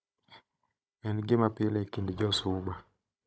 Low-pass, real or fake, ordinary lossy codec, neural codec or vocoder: none; fake; none; codec, 16 kHz, 16 kbps, FunCodec, trained on Chinese and English, 50 frames a second